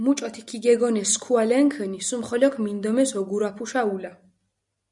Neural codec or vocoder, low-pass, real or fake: none; 10.8 kHz; real